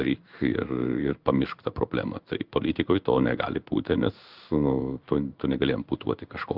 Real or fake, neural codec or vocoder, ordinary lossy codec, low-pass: fake; codec, 16 kHz in and 24 kHz out, 1 kbps, XY-Tokenizer; Opus, 32 kbps; 5.4 kHz